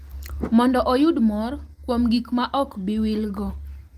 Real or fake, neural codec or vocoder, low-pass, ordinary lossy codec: real; none; 14.4 kHz; Opus, 24 kbps